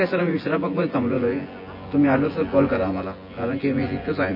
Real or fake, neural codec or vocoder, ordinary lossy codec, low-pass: fake; vocoder, 24 kHz, 100 mel bands, Vocos; MP3, 24 kbps; 5.4 kHz